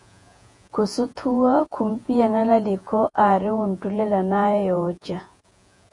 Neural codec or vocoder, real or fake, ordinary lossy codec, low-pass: vocoder, 48 kHz, 128 mel bands, Vocos; fake; AAC, 64 kbps; 10.8 kHz